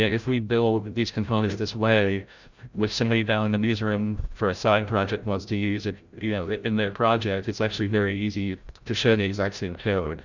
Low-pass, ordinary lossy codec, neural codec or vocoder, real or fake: 7.2 kHz; Opus, 64 kbps; codec, 16 kHz, 0.5 kbps, FreqCodec, larger model; fake